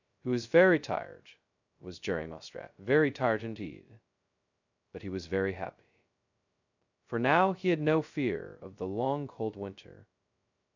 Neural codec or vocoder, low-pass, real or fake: codec, 16 kHz, 0.2 kbps, FocalCodec; 7.2 kHz; fake